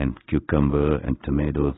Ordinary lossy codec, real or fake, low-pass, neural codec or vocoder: AAC, 16 kbps; fake; 7.2 kHz; codec, 16 kHz in and 24 kHz out, 1 kbps, XY-Tokenizer